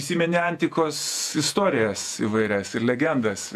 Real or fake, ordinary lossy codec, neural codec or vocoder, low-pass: fake; AAC, 96 kbps; vocoder, 48 kHz, 128 mel bands, Vocos; 14.4 kHz